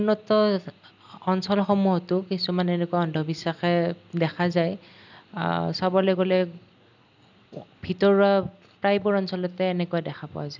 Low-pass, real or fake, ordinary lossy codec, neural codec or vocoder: 7.2 kHz; real; none; none